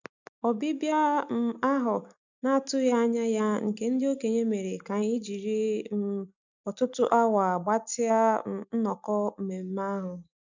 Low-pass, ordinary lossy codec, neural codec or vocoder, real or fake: 7.2 kHz; none; none; real